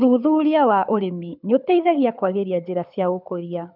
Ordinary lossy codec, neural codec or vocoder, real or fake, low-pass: none; codec, 24 kHz, 6 kbps, HILCodec; fake; 5.4 kHz